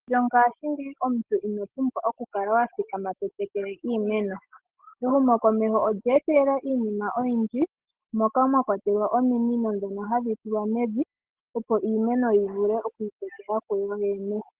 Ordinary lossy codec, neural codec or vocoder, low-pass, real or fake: Opus, 16 kbps; none; 3.6 kHz; real